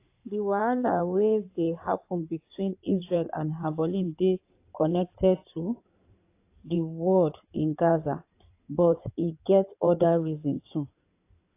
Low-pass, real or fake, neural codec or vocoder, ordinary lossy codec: 3.6 kHz; fake; codec, 16 kHz in and 24 kHz out, 2.2 kbps, FireRedTTS-2 codec; AAC, 24 kbps